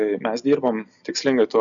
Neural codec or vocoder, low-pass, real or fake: none; 7.2 kHz; real